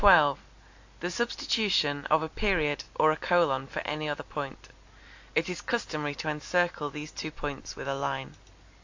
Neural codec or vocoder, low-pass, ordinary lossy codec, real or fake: none; 7.2 kHz; AAC, 48 kbps; real